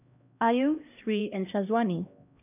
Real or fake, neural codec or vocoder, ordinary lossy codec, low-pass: fake; codec, 16 kHz, 1 kbps, X-Codec, HuBERT features, trained on LibriSpeech; none; 3.6 kHz